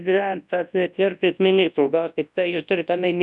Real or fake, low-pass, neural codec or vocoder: fake; 10.8 kHz; codec, 24 kHz, 0.9 kbps, WavTokenizer, large speech release